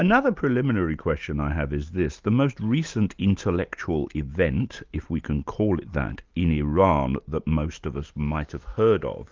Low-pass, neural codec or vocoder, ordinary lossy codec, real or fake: 7.2 kHz; none; Opus, 24 kbps; real